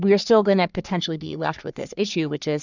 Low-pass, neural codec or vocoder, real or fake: 7.2 kHz; codec, 44.1 kHz, 3.4 kbps, Pupu-Codec; fake